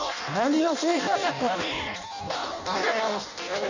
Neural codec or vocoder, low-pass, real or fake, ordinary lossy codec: codec, 16 kHz in and 24 kHz out, 0.6 kbps, FireRedTTS-2 codec; 7.2 kHz; fake; none